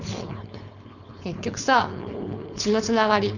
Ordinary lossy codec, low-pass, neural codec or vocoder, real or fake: none; 7.2 kHz; codec, 16 kHz, 4.8 kbps, FACodec; fake